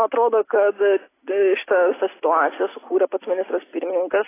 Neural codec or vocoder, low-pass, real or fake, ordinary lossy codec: none; 3.6 kHz; real; AAC, 16 kbps